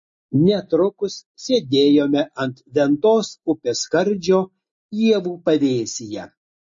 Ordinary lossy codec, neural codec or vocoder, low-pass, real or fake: MP3, 32 kbps; none; 7.2 kHz; real